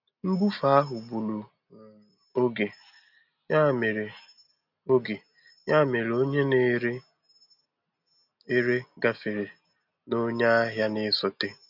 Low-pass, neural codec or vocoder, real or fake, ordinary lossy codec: 5.4 kHz; none; real; none